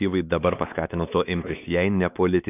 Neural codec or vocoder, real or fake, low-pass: codec, 16 kHz, 2 kbps, X-Codec, WavLM features, trained on Multilingual LibriSpeech; fake; 3.6 kHz